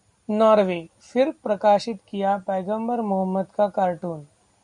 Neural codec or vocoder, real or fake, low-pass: none; real; 10.8 kHz